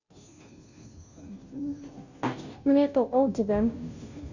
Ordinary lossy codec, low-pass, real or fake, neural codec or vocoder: none; 7.2 kHz; fake; codec, 16 kHz, 0.5 kbps, FunCodec, trained on Chinese and English, 25 frames a second